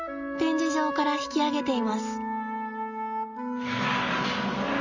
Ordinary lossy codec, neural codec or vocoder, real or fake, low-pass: none; none; real; 7.2 kHz